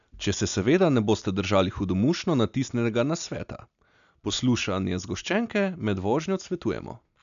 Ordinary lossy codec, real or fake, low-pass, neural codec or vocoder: none; real; 7.2 kHz; none